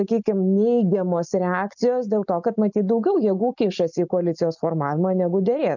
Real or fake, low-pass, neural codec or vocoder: real; 7.2 kHz; none